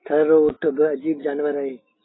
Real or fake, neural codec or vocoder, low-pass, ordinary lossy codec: real; none; 7.2 kHz; AAC, 16 kbps